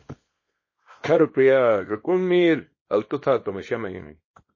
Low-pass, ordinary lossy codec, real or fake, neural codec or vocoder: 7.2 kHz; MP3, 32 kbps; fake; codec, 24 kHz, 0.9 kbps, WavTokenizer, small release